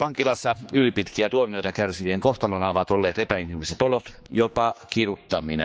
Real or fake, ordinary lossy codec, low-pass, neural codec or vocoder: fake; none; none; codec, 16 kHz, 2 kbps, X-Codec, HuBERT features, trained on general audio